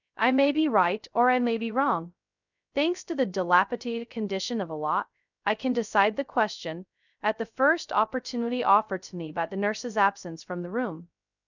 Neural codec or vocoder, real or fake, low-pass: codec, 16 kHz, 0.2 kbps, FocalCodec; fake; 7.2 kHz